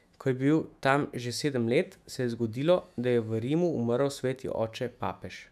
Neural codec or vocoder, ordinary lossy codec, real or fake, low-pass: autoencoder, 48 kHz, 128 numbers a frame, DAC-VAE, trained on Japanese speech; none; fake; 14.4 kHz